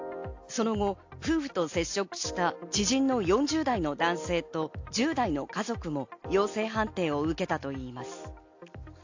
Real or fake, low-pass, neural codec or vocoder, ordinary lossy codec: real; 7.2 kHz; none; AAC, 48 kbps